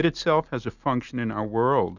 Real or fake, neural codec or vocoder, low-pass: real; none; 7.2 kHz